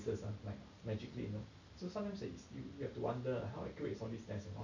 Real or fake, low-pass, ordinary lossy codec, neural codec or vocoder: real; 7.2 kHz; none; none